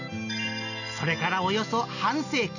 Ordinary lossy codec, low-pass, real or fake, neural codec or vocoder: none; 7.2 kHz; real; none